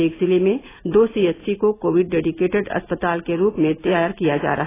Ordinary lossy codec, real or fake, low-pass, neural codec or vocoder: AAC, 16 kbps; real; 3.6 kHz; none